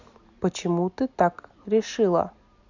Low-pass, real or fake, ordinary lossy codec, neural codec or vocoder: 7.2 kHz; real; none; none